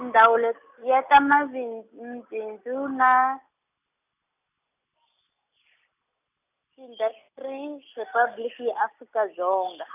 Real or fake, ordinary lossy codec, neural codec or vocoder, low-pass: real; none; none; 3.6 kHz